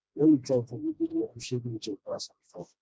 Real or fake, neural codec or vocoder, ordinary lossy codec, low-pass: fake; codec, 16 kHz, 1 kbps, FreqCodec, smaller model; none; none